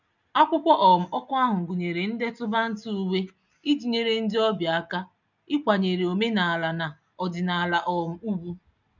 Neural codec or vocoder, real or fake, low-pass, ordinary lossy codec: none; real; 7.2 kHz; none